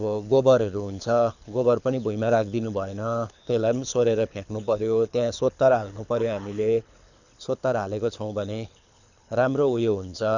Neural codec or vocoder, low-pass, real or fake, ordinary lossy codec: codec, 24 kHz, 6 kbps, HILCodec; 7.2 kHz; fake; none